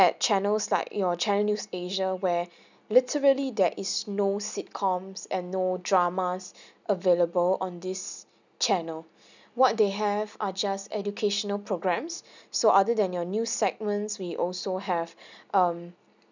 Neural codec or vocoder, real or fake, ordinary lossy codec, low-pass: none; real; none; 7.2 kHz